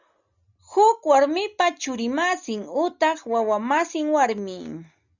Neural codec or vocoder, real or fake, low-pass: none; real; 7.2 kHz